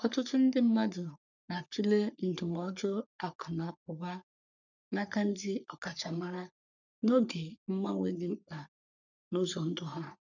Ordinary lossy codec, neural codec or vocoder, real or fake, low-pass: none; codec, 44.1 kHz, 3.4 kbps, Pupu-Codec; fake; 7.2 kHz